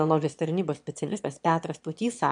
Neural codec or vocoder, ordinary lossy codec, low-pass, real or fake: autoencoder, 22.05 kHz, a latent of 192 numbers a frame, VITS, trained on one speaker; MP3, 64 kbps; 9.9 kHz; fake